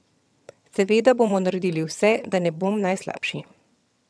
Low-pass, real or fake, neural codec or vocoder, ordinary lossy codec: none; fake; vocoder, 22.05 kHz, 80 mel bands, HiFi-GAN; none